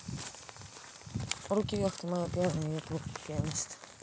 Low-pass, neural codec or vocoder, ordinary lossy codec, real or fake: none; none; none; real